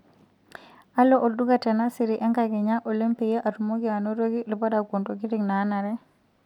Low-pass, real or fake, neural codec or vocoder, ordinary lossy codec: 19.8 kHz; real; none; none